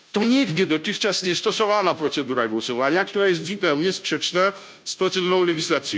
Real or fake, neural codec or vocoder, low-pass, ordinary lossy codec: fake; codec, 16 kHz, 0.5 kbps, FunCodec, trained on Chinese and English, 25 frames a second; none; none